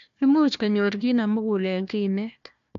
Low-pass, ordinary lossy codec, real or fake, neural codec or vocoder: 7.2 kHz; AAC, 64 kbps; fake; codec, 16 kHz, 1 kbps, FunCodec, trained on Chinese and English, 50 frames a second